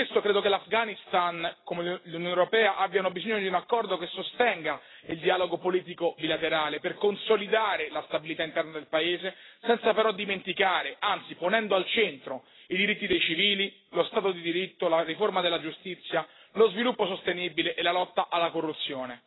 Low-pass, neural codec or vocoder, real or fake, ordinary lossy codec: 7.2 kHz; none; real; AAC, 16 kbps